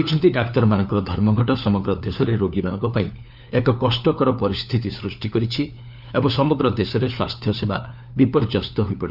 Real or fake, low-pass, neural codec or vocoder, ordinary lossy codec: fake; 5.4 kHz; codec, 16 kHz, 4 kbps, FunCodec, trained on LibriTTS, 50 frames a second; none